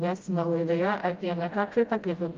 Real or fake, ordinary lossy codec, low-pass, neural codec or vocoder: fake; Opus, 32 kbps; 7.2 kHz; codec, 16 kHz, 0.5 kbps, FreqCodec, smaller model